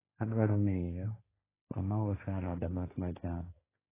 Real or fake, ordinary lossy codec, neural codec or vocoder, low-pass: fake; AAC, 16 kbps; codec, 16 kHz, 1.1 kbps, Voila-Tokenizer; 3.6 kHz